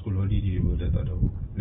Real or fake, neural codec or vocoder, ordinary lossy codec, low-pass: real; none; AAC, 16 kbps; 7.2 kHz